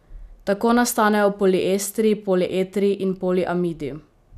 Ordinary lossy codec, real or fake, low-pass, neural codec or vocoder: none; real; 14.4 kHz; none